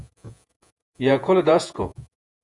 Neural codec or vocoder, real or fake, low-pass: vocoder, 48 kHz, 128 mel bands, Vocos; fake; 10.8 kHz